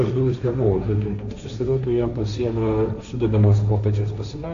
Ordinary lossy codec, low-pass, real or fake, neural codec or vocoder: AAC, 64 kbps; 7.2 kHz; fake; codec, 16 kHz, 1.1 kbps, Voila-Tokenizer